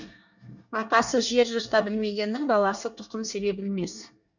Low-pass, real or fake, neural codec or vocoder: 7.2 kHz; fake; codec, 24 kHz, 1 kbps, SNAC